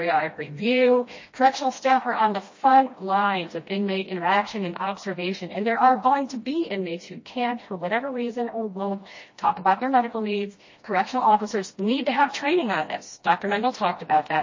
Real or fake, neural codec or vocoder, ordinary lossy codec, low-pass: fake; codec, 16 kHz, 1 kbps, FreqCodec, smaller model; MP3, 32 kbps; 7.2 kHz